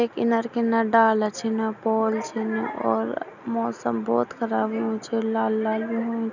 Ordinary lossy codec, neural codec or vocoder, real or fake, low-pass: none; none; real; 7.2 kHz